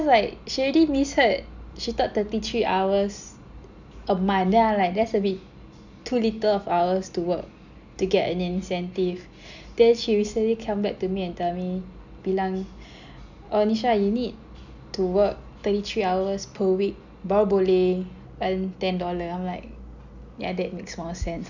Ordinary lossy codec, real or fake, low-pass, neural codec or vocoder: none; real; 7.2 kHz; none